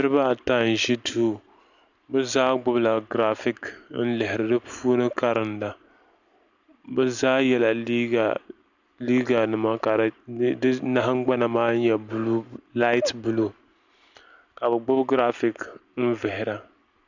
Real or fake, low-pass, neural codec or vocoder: real; 7.2 kHz; none